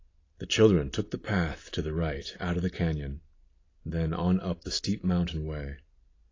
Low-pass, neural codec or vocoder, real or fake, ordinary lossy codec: 7.2 kHz; none; real; AAC, 32 kbps